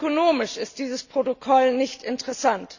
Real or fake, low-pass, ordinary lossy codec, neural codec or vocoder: real; 7.2 kHz; none; none